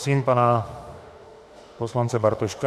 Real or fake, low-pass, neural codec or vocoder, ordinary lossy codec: fake; 14.4 kHz; autoencoder, 48 kHz, 32 numbers a frame, DAC-VAE, trained on Japanese speech; AAC, 96 kbps